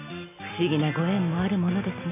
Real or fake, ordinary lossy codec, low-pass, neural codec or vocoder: real; none; 3.6 kHz; none